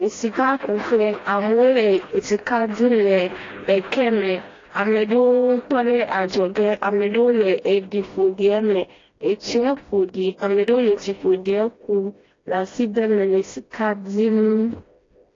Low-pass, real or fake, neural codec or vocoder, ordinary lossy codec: 7.2 kHz; fake; codec, 16 kHz, 1 kbps, FreqCodec, smaller model; AAC, 32 kbps